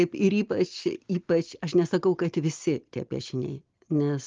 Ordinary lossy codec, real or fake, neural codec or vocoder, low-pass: Opus, 32 kbps; real; none; 7.2 kHz